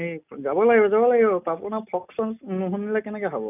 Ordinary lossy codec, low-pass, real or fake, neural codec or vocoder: none; 3.6 kHz; real; none